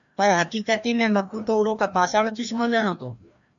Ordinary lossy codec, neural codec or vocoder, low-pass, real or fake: MP3, 64 kbps; codec, 16 kHz, 1 kbps, FreqCodec, larger model; 7.2 kHz; fake